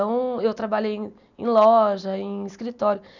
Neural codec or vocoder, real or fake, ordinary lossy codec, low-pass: none; real; none; 7.2 kHz